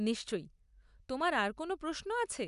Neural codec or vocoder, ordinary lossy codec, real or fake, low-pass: none; none; real; 10.8 kHz